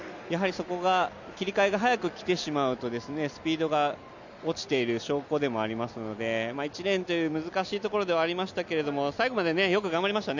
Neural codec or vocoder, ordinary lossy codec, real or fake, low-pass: none; none; real; 7.2 kHz